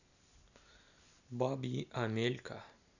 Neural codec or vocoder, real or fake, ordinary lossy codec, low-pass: none; real; none; 7.2 kHz